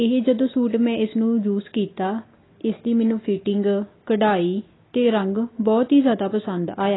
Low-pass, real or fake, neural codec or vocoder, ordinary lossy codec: 7.2 kHz; real; none; AAC, 16 kbps